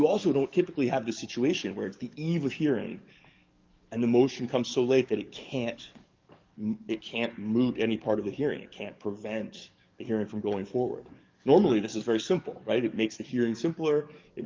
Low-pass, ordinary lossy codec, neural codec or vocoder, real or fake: 7.2 kHz; Opus, 32 kbps; codec, 44.1 kHz, 7.8 kbps, DAC; fake